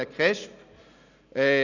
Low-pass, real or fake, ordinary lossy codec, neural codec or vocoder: 7.2 kHz; real; none; none